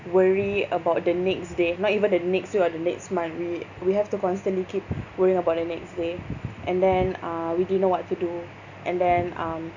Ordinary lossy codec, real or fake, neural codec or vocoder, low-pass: none; real; none; 7.2 kHz